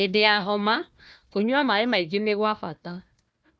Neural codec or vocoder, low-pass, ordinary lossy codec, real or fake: codec, 16 kHz, 1 kbps, FunCodec, trained on Chinese and English, 50 frames a second; none; none; fake